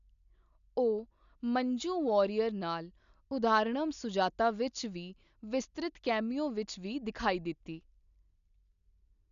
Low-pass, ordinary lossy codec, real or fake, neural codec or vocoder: 7.2 kHz; none; real; none